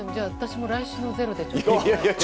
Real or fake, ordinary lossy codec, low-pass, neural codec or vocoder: real; none; none; none